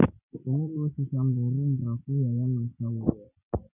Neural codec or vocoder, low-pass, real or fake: none; 3.6 kHz; real